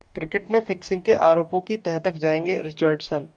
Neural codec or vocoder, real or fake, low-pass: codec, 44.1 kHz, 2.6 kbps, DAC; fake; 9.9 kHz